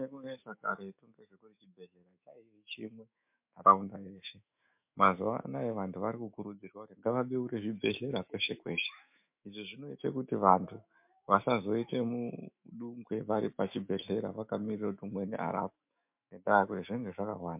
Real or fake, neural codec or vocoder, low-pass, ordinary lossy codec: fake; autoencoder, 48 kHz, 128 numbers a frame, DAC-VAE, trained on Japanese speech; 3.6 kHz; MP3, 32 kbps